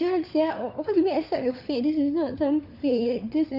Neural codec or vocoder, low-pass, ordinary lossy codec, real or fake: codec, 16 kHz, 4 kbps, FunCodec, trained on LibriTTS, 50 frames a second; 5.4 kHz; none; fake